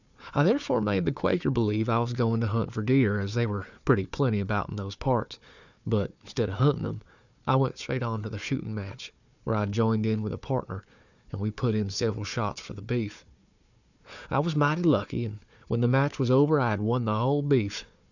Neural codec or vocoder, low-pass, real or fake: codec, 16 kHz, 4 kbps, FunCodec, trained on Chinese and English, 50 frames a second; 7.2 kHz; fake